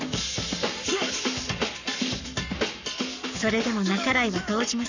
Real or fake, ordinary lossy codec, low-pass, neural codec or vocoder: fake; none; 7.2 kHz; codec, 44.1 kHz, 7.8 kbps, Pupu-Codec